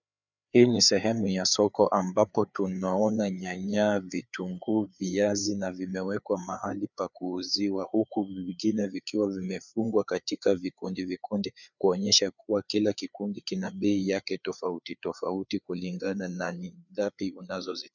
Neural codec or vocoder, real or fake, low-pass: codec, 16 kHz, 4 kbps, FreqCodec, larger model; fake; 7.2 kHz